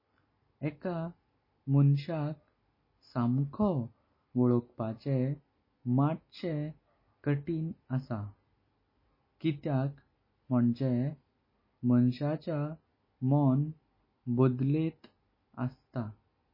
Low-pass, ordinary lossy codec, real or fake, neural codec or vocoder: 5.4 kHz; MP3, 24 kbps; real; none